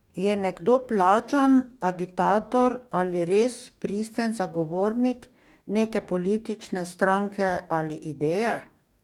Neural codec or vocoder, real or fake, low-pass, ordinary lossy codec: codec, 44.1 kHz, 2.6 kbps, DAC; fake; 19.8 kHz; none